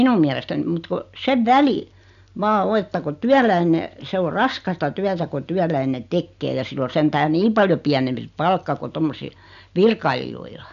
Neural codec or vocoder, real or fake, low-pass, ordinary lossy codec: none; real; 7.2 kHz; none